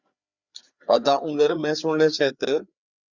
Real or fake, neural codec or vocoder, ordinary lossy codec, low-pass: fake; codec, 16 kHz, 4 kbps, FreqCodec, larger model; Opus, 64 kbps; 7.2 kHz